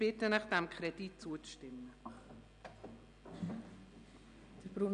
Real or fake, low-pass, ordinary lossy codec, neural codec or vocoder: real; 9.9 kHz; none; none